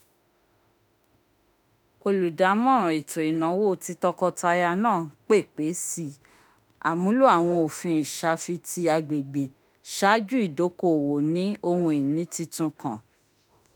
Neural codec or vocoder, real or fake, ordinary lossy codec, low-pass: autoencoder, 48 kHz, 32 numbers a frame, DAC-VAE, trained on Japanese speech; fake; none; none